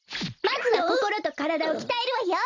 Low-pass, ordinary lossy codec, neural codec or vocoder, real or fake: 7.2 kHz; Opus, 64 kbps; vocoder, 44.1 kHz, 128 mel bands every 256 samples, BigVGAN v2; fake